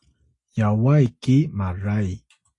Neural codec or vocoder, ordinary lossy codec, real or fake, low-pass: none; AAC, 48 kbps; real; 10.8 kHz